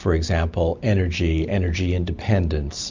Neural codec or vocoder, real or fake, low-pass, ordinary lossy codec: none; real; 7.2 kHz; MP3, 64 kbps